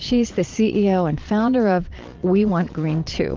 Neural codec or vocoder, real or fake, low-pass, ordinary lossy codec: vocoder, 22.05 kHz, 80 mel bands, Vocos; fake; 7.2 kHz; Opus, 32 kbps